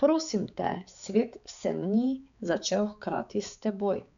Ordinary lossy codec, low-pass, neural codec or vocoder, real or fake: none; 7.2 kHz; codec, 16 kHz, 4 kbps, X-Codec, HuBERT features, trained on balanced general audio; fake